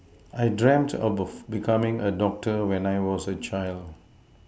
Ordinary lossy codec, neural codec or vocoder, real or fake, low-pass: none; none; real; none